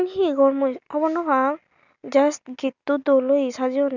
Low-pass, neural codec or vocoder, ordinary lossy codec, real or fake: 7.2 kHz; none; none; real